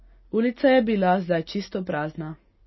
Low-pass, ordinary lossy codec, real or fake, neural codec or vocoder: 7.2 kHz; MP3, 24 kbps; real; none